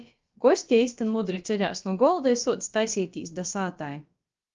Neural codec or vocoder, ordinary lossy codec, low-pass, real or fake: codec, 16 kHz, about 1 kbps, DyCAST, with the encoder's durations; Opus, 32 kbps; 7.2 kHz; fake